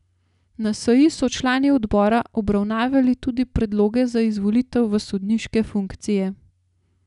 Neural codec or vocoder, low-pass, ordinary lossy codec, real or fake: none; 10.8 kHz; none; real